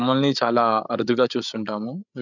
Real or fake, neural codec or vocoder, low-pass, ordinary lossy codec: fake; codec, 16 kHz, 8 kbps, FreqCodec, larger model; 7.2 kHz; none